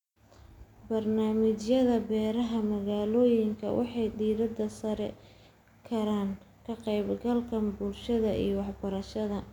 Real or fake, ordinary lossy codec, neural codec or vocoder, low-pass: real; none; none; 19.8 kHz